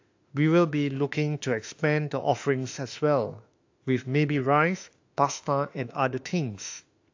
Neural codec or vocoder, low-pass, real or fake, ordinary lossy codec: autoencoder, 48 kHz, 32 numbers a frame, DAC-VAE, trained on Japanese speech; 7.2 kHz; fake; AAC, 48 kbps